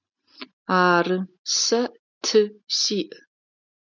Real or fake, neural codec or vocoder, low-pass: real; none; 7.2 kHz